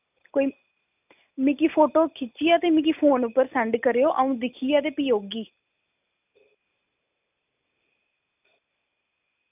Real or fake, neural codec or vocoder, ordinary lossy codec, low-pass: real; none; none; 3.6 kHz